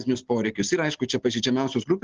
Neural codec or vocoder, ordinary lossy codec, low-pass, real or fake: none; Opus, 16 kbps; 7.2 kHz; real